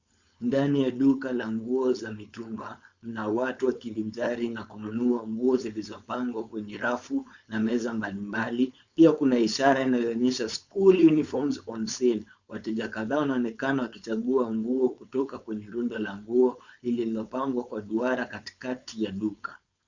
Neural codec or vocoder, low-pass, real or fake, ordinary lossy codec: codec, 16 kHz, 4.8 kbps, FACodec; 7.2 kHz; fake; Opus, 64 kbps